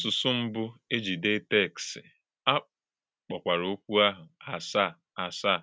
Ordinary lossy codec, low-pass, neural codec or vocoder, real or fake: none; none; none; real